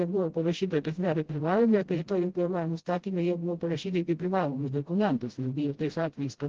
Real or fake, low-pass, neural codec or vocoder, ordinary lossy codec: fake; 7.2 kHz; codec, 16 kHz, 0.5 kbps, FreqCodec, smaller model; Opus, 16 kbps